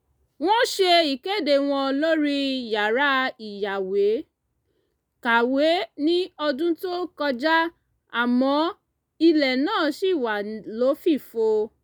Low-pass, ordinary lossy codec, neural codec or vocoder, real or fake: none; none; none; real